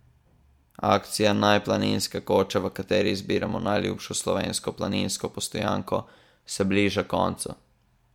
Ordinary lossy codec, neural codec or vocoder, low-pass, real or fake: MP3, 96 kbps; none; 19.8 kHz; real